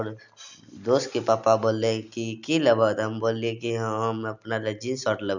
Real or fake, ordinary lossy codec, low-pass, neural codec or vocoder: real; none; 7.2 kHz; none